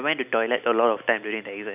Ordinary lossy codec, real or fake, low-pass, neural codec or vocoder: none; real; 3.6 kHz; none